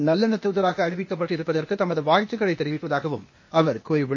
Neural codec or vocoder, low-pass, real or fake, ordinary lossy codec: codec, 16 kHz, 0.8 kbps, ZipCodec; 7.2 kHz; fake; MP3, 32 kbps